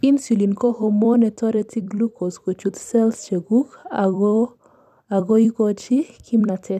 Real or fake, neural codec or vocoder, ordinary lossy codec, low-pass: fake; vocoder, 44.1 kHz, 128 mel bands every 256 samples, BigVGAN v2; none; 14.4 kHz